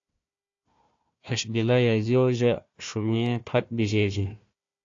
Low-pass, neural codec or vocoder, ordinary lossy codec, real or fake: 7.2 kHz; codec, 16 kHz, 1 kbps, FunCodec, trained on Chinese and English, 50 frames a second; AAC, 48 kbps; fake